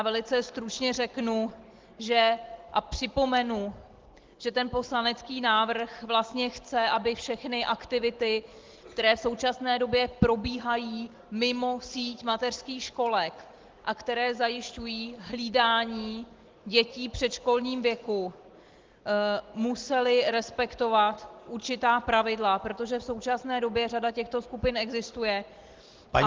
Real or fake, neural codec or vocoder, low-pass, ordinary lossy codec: real; none; 7.2 kHz; Opus, 16 kbps